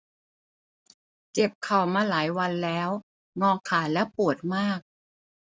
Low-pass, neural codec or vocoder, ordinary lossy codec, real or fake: none; none; none; real